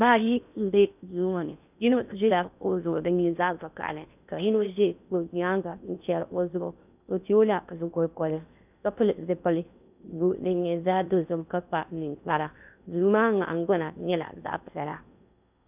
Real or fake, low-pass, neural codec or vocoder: fake; 3.6 kHz; codec, 16 kHz in and 24 kHz out, 0.6 kbps, FocalCodec, streaming, 2048 codes